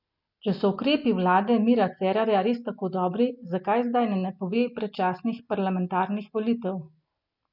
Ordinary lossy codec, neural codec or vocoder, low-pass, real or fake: none; none; 5.4 kHz; real